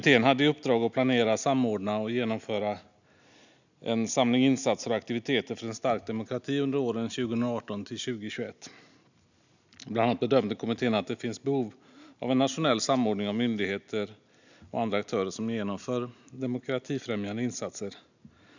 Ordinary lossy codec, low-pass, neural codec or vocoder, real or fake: none; 7.2 kHz; none; real